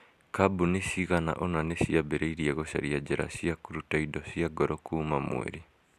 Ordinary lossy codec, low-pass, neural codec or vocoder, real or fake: none; 14.4 kHz; none; real